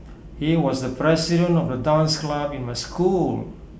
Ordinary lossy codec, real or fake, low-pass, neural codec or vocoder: none; real; none; none